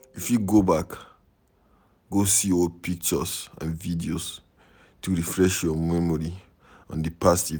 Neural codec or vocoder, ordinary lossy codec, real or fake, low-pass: none; none; real; none